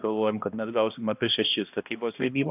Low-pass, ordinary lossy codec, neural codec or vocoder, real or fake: 3.6 kHz; AAC, 32 kbps; codec, 16 kHz, 1 kbps, X-Codec, HuBERT features, trained on balanced general audio; fake